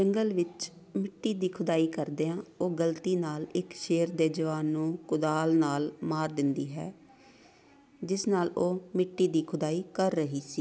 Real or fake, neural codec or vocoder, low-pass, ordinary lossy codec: real; none; none; none